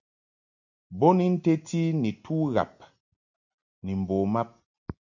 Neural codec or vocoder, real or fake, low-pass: none; real; 7.2 kHz